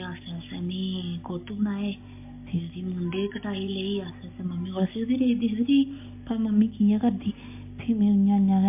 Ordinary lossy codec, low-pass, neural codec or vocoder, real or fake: MP3, 24 kbps; 3.6 kHz; none; real